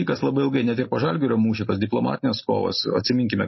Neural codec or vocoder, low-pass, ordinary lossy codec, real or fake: none; 7.2 kHz; MP3, 24 kbps; real